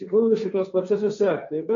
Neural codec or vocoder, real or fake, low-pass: codec, 16 kHz, 1.1 kbps, Voila-Tokenizer; fake; 7.2 kHz